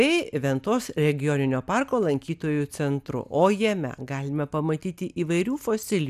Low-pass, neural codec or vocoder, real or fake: 14.4 kHz; none; real